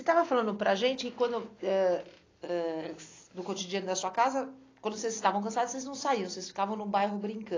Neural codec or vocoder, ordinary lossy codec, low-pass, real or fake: none; AAC, 32 kbps; 7.2 kHz; real